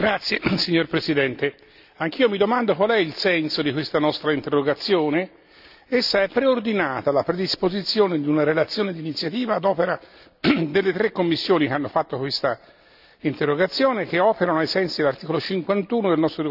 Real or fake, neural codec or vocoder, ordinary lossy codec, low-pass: real; none; none; 5.4 kHz